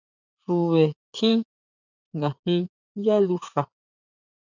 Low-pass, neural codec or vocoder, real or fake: 7.2 kHz; vocoder, 44.1 kHz, 80 mel bands, Vocos; fake